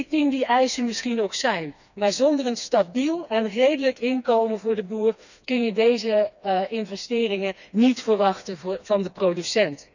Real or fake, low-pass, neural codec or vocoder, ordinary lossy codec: fake; 7.2 kHz; codec, 16 kHz, 2 kbps, FreqCodec, smaller model; none